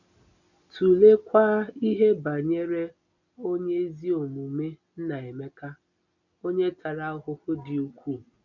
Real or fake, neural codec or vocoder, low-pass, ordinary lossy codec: real; none; 7.2 kHz; none